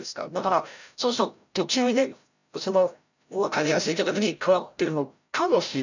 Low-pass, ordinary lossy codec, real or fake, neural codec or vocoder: 7.2 kHz; none; fake; codec, 16 kHz, 0.5 kbps, FreqCodec, larger model